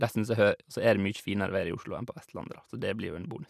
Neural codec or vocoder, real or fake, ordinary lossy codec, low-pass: none; real; none; 14.4 kHz